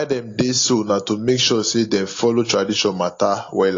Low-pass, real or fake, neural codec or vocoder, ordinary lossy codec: 7.2 kHz; real; none; AAC, 32 kbps